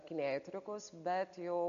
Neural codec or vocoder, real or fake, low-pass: none; real; 7.2 kHz